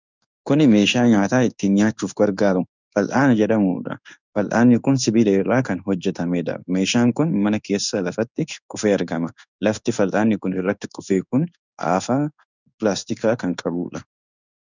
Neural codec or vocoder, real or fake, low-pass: codec, 16 kHz in and 24 kHz out, 1 kbps, XY-Tokenizer; fake; 7.2 kHz